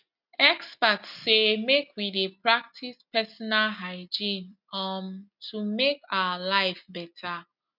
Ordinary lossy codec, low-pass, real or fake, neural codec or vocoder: none; 5.4 kHz; real; none